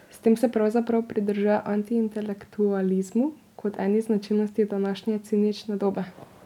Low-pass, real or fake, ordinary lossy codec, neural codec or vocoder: 19.8 kHz; real; none; none